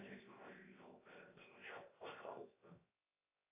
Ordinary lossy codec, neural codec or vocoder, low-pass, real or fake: AAC, 24 kbps; codec, 16 kHz, 0.7 kbps, FocalCodec; 3.6 kHz; fake